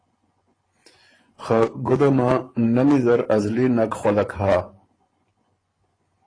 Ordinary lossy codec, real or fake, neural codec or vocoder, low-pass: AAC, 32 kbps; fake; vocoder, 24 kHz, 100 mel bands, Vocos; 9.9 kHz